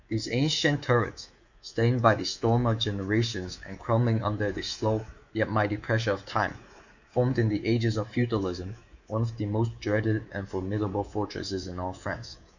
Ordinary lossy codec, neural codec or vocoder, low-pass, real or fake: Opus, 64 kbps; codec, 24 kHz, 3.1 kbps, DualCodec; 7.2 kHz; fake